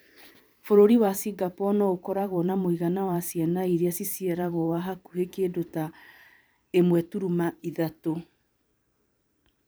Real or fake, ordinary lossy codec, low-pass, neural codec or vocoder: fake; none; none; vocoder, 44.1 kHz, 128 mel bands every 512 samples, BigVGAN v2